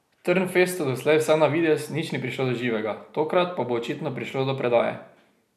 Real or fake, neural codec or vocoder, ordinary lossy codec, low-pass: real; none; none; 14.4 kHz